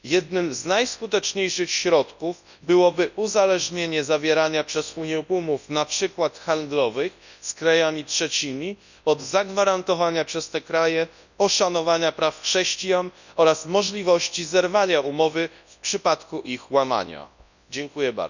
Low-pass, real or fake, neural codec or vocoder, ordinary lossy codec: 7.2 kHz; fake; codec, 24 kHz, 0.9 kbps, WavTokenizer, large speech release; none